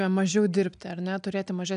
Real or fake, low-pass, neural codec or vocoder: real; 9.9 kHz; none